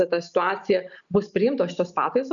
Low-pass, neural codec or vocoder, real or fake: 7.2 kHz; none; real